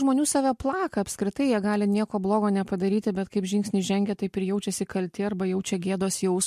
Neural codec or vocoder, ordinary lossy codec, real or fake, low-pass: none; MP3, 64 kbps; real; 14.4 kHz